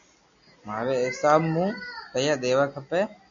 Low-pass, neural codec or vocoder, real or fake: 7.2 kHz; none; real